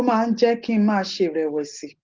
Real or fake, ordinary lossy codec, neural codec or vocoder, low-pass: real; Opus, 16 kbps; none; 7.2 kHz